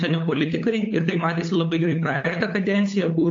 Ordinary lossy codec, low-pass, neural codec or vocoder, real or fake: AAC, 48 kbps; 7.2 kHz; codec, 16 kHz, 8 kbps, FunCodec, trained on LibriTTS, 25 frames a second; fake